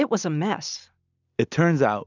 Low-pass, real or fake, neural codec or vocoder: 7.2 kHz; real; none